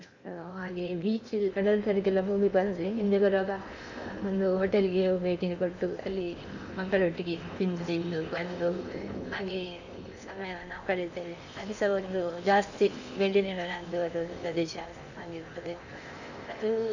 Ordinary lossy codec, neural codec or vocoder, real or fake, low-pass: none; codec, 16 kHz in and 24 kHz out, 0.8 kbps, FocalCodec, streaming, 65536 codes; fake; 7.2 kHz